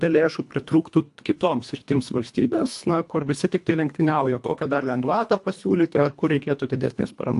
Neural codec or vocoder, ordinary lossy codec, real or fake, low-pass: codec, 24 kHz, 1.5 kbps, HILCodec; AAC, 64 kbps; fake; 10.8 kHz